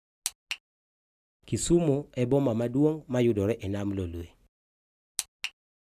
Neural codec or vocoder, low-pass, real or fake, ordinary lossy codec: none; 14.4 kHz; real; none